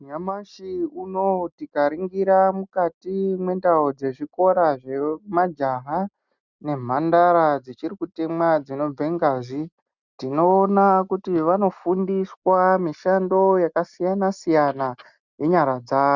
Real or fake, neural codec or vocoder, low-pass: real; none; 7.2 kHz